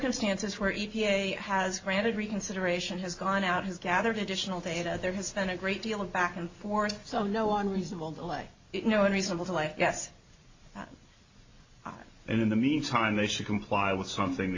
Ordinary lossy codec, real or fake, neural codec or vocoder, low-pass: AAC, 48 kbps; real; none; 7.2 kHz